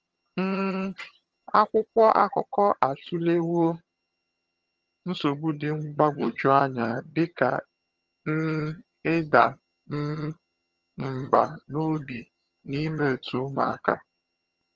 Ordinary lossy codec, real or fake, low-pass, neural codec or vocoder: Opus, 24 kbps; fake; 7.2 kHz; vocoder, 22.05 kHz, 80 mel bands, HiFi-GAN